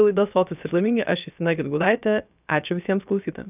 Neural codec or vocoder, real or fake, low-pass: codec, 16 kHz, about 1 kbps, DyCAST, with the encoder's durations; fake; 3.6 kHz